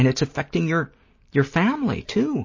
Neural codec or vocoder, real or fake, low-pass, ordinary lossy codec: none; real; 7.2 kHz; MP3, 32 kbps